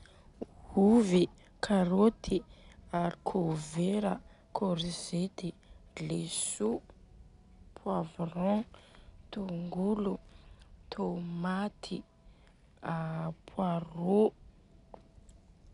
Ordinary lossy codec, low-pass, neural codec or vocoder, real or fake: none; 10.8 kHz; none; real